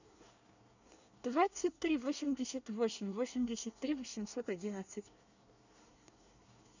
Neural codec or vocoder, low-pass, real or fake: codec, 24 kHz, 1 kbps, SNAC; 7.2 kHz; fake